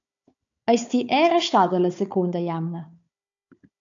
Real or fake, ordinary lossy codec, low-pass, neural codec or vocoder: fake; AAC, 64 kbps; 7.2 kHz; codec, 16 kHz, 4 kbps, FunCodec, trained on Chinese and English, 50 frames a second